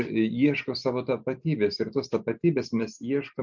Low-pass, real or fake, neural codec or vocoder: 7.2 kHz; real; none